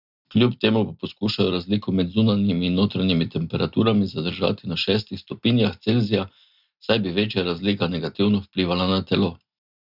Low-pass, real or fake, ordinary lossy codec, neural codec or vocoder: 5.4 kHz; real; none; none